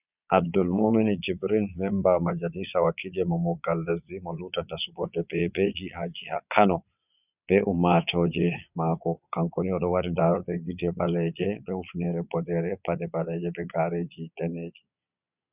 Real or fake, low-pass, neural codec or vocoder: fake; 3.6 kHz; vocoder, 22.05 kHz, 80 mel bands, Vocos